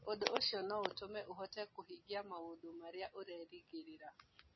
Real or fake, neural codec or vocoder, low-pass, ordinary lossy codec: real; none; 7.2 kHz; MP3, 24 kbps